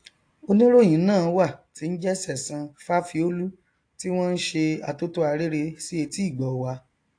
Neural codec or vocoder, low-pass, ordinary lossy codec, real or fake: none; 9.9 kHz; AAC, 48 kbps; real